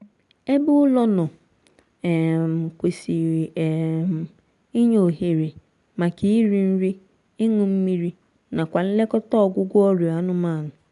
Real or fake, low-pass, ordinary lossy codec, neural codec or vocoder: real; 10.8 kHz; none; none